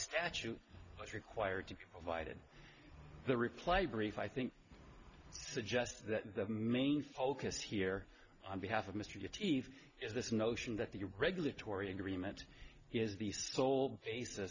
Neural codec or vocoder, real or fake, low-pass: none; real; 7.2 kHz